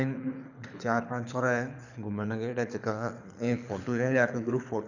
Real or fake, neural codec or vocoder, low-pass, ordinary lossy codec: fake; codec, 24 kHz, 6 kbps, HILCodec; 7.2 kHz; none